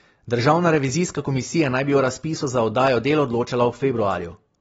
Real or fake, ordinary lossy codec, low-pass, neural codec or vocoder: real; AAC, 24 kbps; 19.8 kHz; none